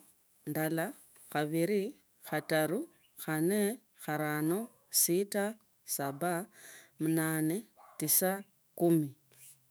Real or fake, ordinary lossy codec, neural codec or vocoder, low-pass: fake; none; autoencoder, 48 kHz, 128 numbers a frame, DAC-VAE, trained on Japanese speech; none